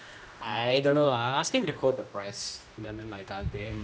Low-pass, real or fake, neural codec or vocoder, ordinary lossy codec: none; fake; codec, 16 kHz, 1 kbps, X-Codec, HuBERT features, trained on general audio; none